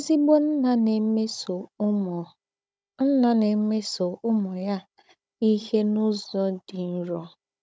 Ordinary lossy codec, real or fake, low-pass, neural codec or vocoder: none; fake; none; codec, 16 kHz, 16 kbps, FunCodec, trained on Chinese and English, 50 frames a second